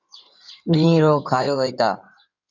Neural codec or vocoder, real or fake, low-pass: codec, 16 kHz in and 24 kHz out, 2.2 kbps, FireRedTTS-2 codec; fake; 7.2 kHz